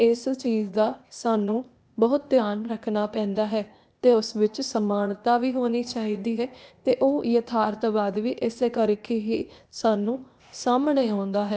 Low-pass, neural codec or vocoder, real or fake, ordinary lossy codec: none; codec, 16 kHz, 0.8 kbps, ZipCodec; fake; none